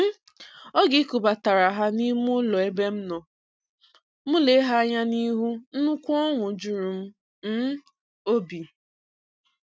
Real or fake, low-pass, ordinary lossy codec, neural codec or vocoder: real; none; none; none